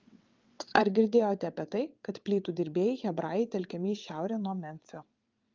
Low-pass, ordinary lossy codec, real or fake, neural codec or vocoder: 7.2 kHz; Opus, 24 kbps; fake; vocoder, 22.05 kHz, 80 mel bands, WaveNeXt